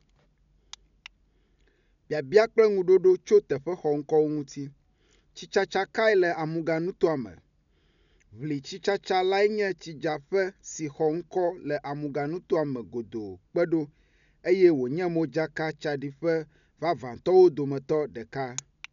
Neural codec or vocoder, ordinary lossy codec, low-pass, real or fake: none; none; 7.2 kHz; real